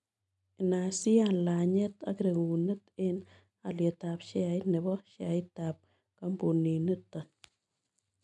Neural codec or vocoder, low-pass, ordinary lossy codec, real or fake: none; 10.8 kHz; none; real